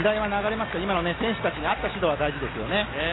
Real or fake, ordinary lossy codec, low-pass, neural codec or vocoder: real; AAC, 16 kbps; 7.2 kHz; none